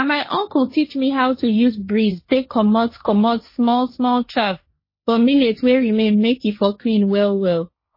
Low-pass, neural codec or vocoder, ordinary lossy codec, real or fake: 5.4 kHz; codec, 16 kHz, 1.1 kbps, Voila-Tokenizer; MP3, 24 kbps; fake